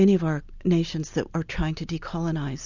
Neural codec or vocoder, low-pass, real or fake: none; 7.2 kHz; real